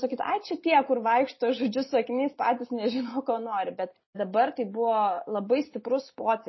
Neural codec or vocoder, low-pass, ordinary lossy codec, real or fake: none; 7.2 kHz; MP3, 24 kbps; real